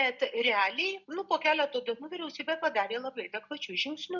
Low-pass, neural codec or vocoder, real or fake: 7.2 kHz; none; real